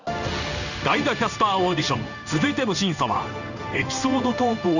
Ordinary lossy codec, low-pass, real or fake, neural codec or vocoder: none; 7.2 kHz; fake; codec, 16 kHz in and 24 kHz out, 1 kbps, XY-Tokenizer